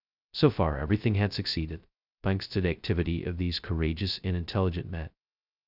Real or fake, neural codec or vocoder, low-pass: fake; codec, 16 kHz, 0.2 kbps, FocalCodec; 5.4 kHz